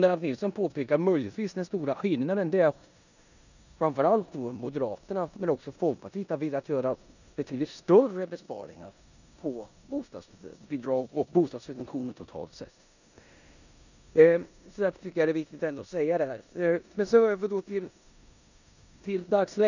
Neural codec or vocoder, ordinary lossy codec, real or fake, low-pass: codec, 16 kHz in and 24 kHz out, 0.9 kbps, LongCat-Audio-Codec, four codebook decoder; none; fake; 7.2 kHz